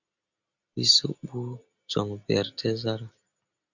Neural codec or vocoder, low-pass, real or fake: none; 7.2 kHz; real